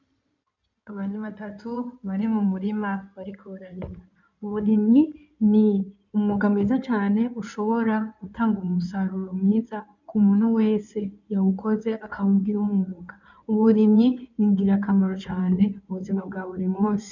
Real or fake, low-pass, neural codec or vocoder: fake; 7.2 kHz; codec, 16 kHz in and 24 kHz out, 2.2 kbps, FireRedTTS-2 codec